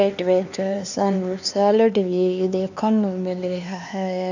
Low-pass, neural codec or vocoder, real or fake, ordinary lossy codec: 7.2 kHz; codec, 16 kHz, 4 kbps, X-Codec, HuBERT features, trained on LibriSpeech; fake; none